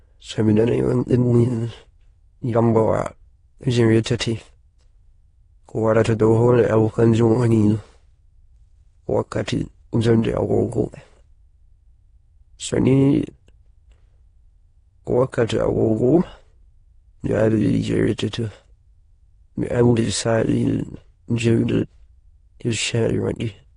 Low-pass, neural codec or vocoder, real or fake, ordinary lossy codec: 9.9 kHz; autoencoder, 22.05 kHz, a latent of 192 numbers a frame, VITS, trained on many speakers; fake; AAC, 32 kbps